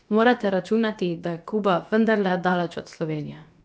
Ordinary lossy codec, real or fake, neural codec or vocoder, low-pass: none; fake; codec, 16 kHz, about 1 kbps, DyCAST, with the encoder's durations; none